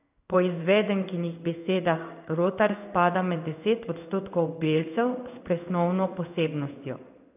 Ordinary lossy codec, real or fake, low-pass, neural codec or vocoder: AAC, 32 kbps; fake; 3.6 kHz; codec, 16 kHz in and 24 kHz out, 1 kbps, XY-Tokenizer